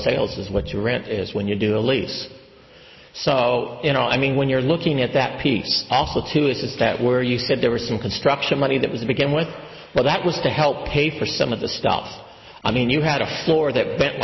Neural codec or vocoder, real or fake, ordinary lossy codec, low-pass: none; real; MP3, 24 kbps; 7.2 kHz